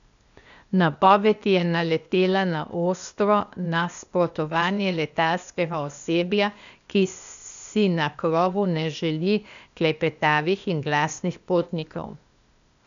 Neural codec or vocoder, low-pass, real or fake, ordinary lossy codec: codec, 16 kHz, 0.8 kbps, ZipCodec; 7.2 kHz; fake; none